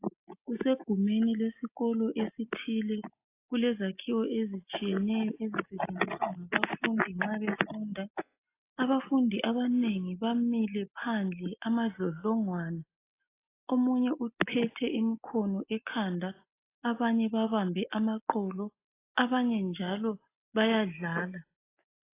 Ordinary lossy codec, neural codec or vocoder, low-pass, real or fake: AAC, 24 kbps; none; 3.6 kHz; real